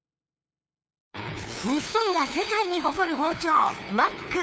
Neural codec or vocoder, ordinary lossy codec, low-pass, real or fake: codec, 16 kHz, 2 kbps, FunCodec, trained on LibriTTS, 25 frames a second; none; none; fake